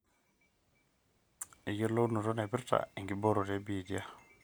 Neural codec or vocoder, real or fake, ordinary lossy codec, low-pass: none; real; none; none